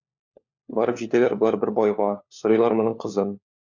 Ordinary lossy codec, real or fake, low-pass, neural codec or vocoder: MP3, 64 kbps; fake; 7.2 kHz; codec, 16 kHz, 4 kbps, FunCodec, trained on LibriTTS, 50 frames a second